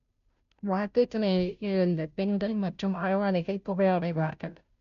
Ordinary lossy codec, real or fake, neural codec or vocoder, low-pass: none; fake; codec, 16 kHz, 0.5 kbps, FunCodec, trained on Chinese and English, 25 frames a second; 7.2 kHz